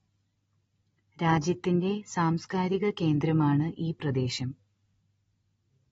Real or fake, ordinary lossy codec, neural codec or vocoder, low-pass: real; AAC, 24 kbps; none; 19.8 kHz